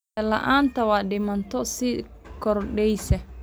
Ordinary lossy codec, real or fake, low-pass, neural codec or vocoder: none; real; none; none